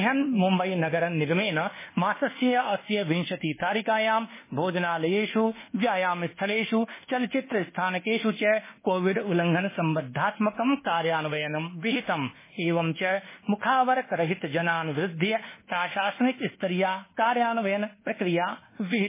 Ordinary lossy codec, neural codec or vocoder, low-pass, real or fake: MP3, 16 kbps; codec, 24 kHz, 1.2 kbps, DualCodec; 3.6 kHz; fake